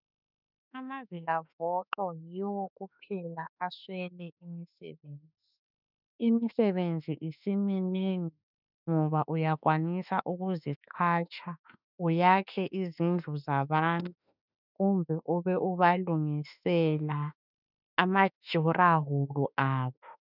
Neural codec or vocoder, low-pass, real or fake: autoencoder, 48 kHz, 32 numbers a frame, DAC-VAE, trained on Japanese speech; 5.4 kHz; fake